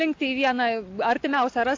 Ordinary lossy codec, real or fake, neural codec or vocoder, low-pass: AAC, 48 kbps; real; none; 7.2 kHz